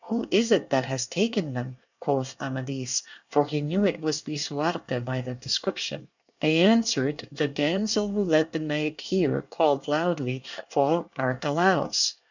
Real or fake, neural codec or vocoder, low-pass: fake; codec, 24 kHz, 1 kbps, SNAC; 7.2 kHz